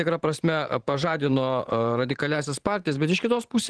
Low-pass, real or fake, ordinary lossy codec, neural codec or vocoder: 10.8 kHz; fake; Opus, 16 kbps; vocoder, 44.1 kHz, 128 mel bands every 512 samples, BigVGAN v2